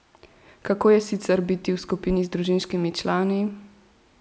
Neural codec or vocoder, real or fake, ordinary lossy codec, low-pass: none; real; none; none